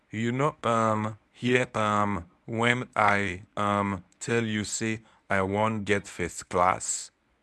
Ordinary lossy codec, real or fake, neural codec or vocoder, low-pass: none; fake; codec, 24 kHz, 0.9 kbps, WavTokenizer, medium speech release version 1; none